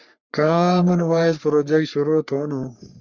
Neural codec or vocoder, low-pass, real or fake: codec, 44.1 kHz, 3.4 kbps, Pupu-Codec; 7.2 kHz; fake